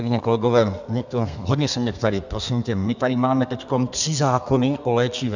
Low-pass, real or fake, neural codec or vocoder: 7.2 kHz; fake; codec, 32 kHz, 1.9 kbps, SNAC